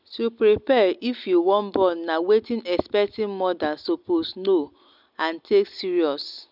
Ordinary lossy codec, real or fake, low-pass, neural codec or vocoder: none; real; 5.4 kHz; none